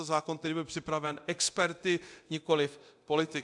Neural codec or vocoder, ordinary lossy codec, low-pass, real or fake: codec, 24 kHz, 0.9 kbps, DualCodec; AAC, 64 kbps; 10.8 kHz; fake